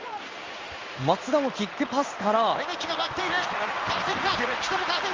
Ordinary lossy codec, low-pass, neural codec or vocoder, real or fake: Opus, 32 kbps; 7.2 kHz; codec, 16 kHz in and 24 kHz out, 1 kbps, XY-Tokenizer; fake